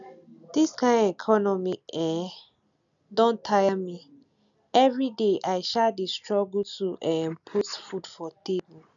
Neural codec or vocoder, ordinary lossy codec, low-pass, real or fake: none; none; 7.2 kHz; real